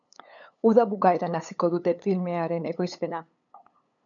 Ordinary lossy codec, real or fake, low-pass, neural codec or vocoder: MP3, 96 kbps; fake; 7.2 kHz; codec, 16 kHz, 8 kbps, FunCodec, trained on LibriTTS, 25 frames a second